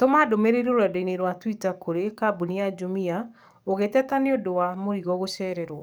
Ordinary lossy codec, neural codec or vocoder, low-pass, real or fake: none; codec, 44.1 kHz, 7.8 kbps, DAC; none; fake